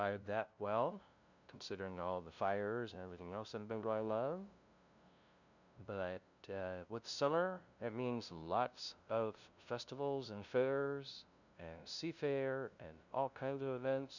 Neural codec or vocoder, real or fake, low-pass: codec, 16 kHz, 0.5 kbps, FunCodec, trained on LibriTTS, 25 frames a second; fake; 7.2 kHz